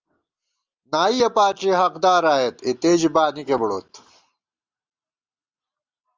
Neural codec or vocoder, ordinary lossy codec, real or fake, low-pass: none; Opus, 32 kbps; real; 7.2 kHz